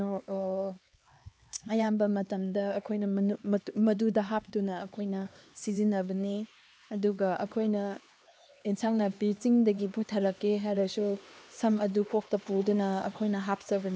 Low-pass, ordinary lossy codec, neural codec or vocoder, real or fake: none; none; codec, 16 kHz, 2 kbps, X-Codec, HuBERT features, trained on LibriSpeech; fake